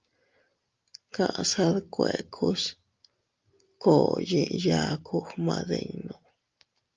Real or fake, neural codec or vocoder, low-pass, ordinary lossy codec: real; none; 7.2 kHz; Opus, 24 kbps